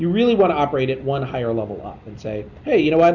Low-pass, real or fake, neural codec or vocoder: 7.2 kHz; real; none